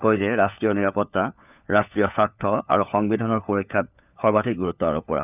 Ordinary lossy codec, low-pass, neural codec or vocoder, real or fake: none; 3.6 kHz; codec, 16 kHz, 4 kbps, FunCodec, trained on LibriTTS, 50 frames a second; fake